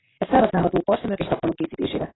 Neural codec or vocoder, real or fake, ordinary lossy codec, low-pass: vocoder, 44.1 kHz, 128 mel bands every 512 samples, BigVGAN v2; fake; AAC, 16 kbps; 7.2 kHz